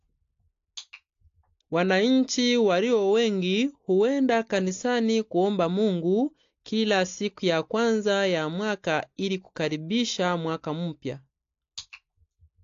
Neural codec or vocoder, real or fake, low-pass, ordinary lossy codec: none; real; 7.2 kHz; AAC, 48 kbps